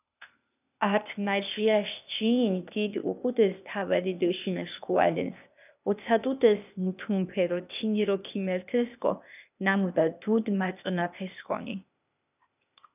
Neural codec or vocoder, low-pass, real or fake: codec, 16 kHz, 0.8 kbps, ZipCodec; 3.6 kHz; fake